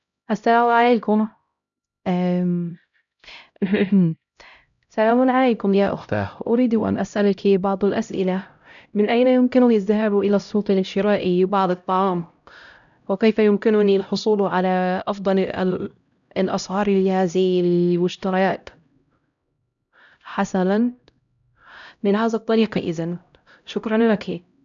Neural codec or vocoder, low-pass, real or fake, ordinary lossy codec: codec, 16 kHz, 0.5 kbps, X-Codec, HuBERT features, trained on LibriSpeech; 7.2 kHz; fake; none